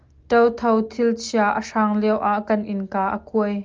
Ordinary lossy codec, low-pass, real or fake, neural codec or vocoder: Opus, 24 kbps; 7.2 kHz; real; none